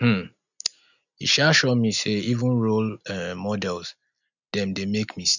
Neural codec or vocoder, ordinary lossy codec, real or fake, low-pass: none; none; real; 7.2 kHz